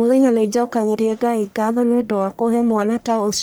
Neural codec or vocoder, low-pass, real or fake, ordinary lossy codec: codec, 44.1 kHz, 1.7 kbps, Pupu-Codec; none; fake; none